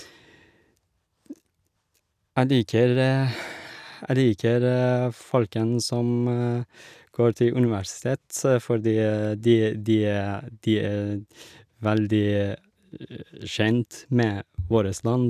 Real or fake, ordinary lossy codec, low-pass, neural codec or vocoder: real; none; 14.4 kHz; none